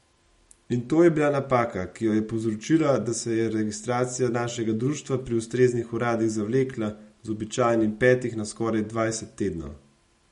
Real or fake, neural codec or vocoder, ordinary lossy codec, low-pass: real; none; MP3, 48 kbps; 19.8 kHz